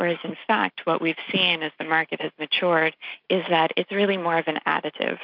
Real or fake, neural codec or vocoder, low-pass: real; none; 5.4 kHz